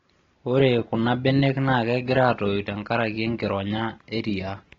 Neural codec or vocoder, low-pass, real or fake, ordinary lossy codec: none; 7.2 kHz; real; AAC, 24 kbps